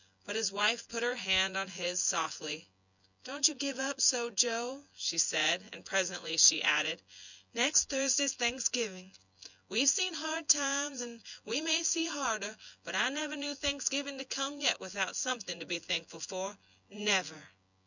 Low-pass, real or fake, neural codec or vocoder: 7.2 kHz; fake; vocoder, 24 kHz, 100 mel bands, Vocos